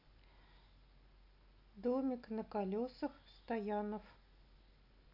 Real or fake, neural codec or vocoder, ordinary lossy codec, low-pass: real; none; none; 5.4 kHz